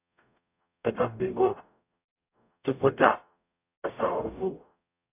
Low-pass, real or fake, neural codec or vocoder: 3.6 kHz; fake; codec, 44.1 kHz, 0.9 kbps, DAC